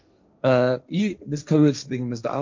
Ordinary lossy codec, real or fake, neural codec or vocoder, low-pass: MP3, 64 kbps; fake; codec, 16 kHz, 1.1 kbps, Voila-Tokenizer; 7.2 kHz